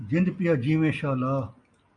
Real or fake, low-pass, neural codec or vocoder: real; 9.9 kHz; none